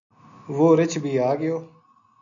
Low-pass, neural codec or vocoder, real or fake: 7.2 kHz; none; real